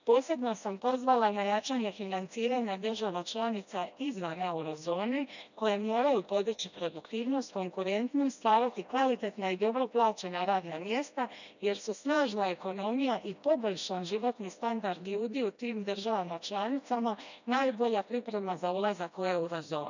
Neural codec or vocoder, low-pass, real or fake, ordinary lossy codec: codec, 16 kHz, 1 kbps, FreqCodec, smaller model; 7.2 kHz; fake; none